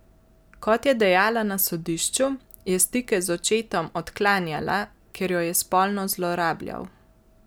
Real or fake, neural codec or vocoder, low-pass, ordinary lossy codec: real; none; none; none